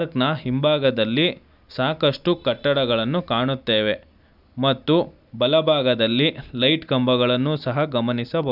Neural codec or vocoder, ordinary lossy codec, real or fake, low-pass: none; none; real; 5.4 kHz